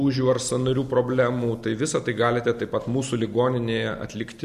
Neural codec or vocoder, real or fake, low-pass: none; real; 14.4 kHz